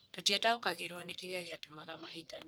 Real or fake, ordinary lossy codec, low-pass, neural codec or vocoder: fake; none; none; codec, 44.1 kHz, 1.7 kbps, Pupu-Codec